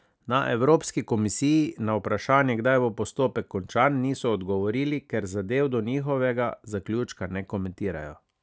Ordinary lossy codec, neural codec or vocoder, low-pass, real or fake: none; none; none; real